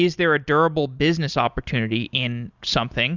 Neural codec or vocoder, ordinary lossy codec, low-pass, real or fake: none; Opus, 64 kbps; 7.2 kHz; real